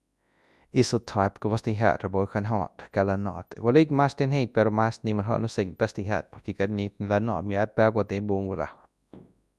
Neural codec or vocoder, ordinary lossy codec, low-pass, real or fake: codec, 24 kHz, 0.9 kbps, WavTokenizer, large speech release; none; none; fake